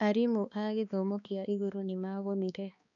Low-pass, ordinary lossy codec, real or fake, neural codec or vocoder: 7.2 kHz; none; fake; codec, 16 kHz, 2 kbps, X-Codec, HuBERT features, trained on balanced general audio